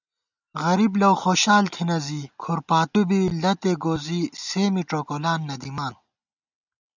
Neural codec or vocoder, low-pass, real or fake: none; 7.2 kHz; real